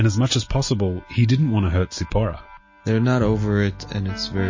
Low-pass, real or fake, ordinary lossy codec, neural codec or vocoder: 7.2 kHz; real; MP3, 32 kbps; none